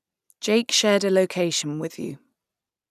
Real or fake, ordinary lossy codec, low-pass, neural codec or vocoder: real; none; 14.4 kHz; none